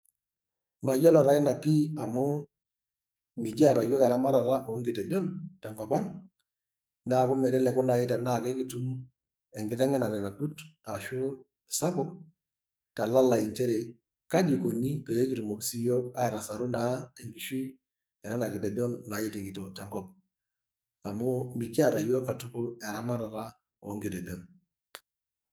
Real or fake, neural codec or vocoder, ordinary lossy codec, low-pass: fake; codec, 44.1 kHz, 2.6 kbps, SNAC; none; none